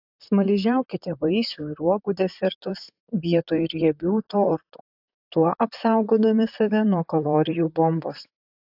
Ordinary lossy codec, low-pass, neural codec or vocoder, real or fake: AAC, 48 kbps; 5.4 kHz; vocoder, 44.1 kHz, 128 mel bands, Pupu-Vocoder; fake